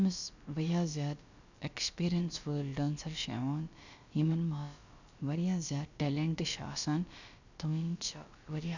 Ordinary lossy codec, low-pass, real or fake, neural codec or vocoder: none; 7.2 kHz; fake; codec, 16 kHz, about 1 kbps, DyCAST, with the encoder's durations